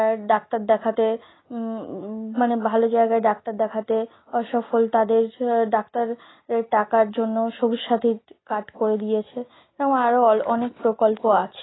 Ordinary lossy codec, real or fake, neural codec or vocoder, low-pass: AAC, 16 kbps; real; none; 7.2 kHz